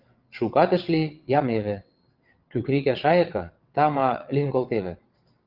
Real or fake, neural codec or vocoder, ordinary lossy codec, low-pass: fake; vocoder, 22.05 kHz, 80 mel bands, WaveNeXt; Opus, 24 kbps; 5.4 kHz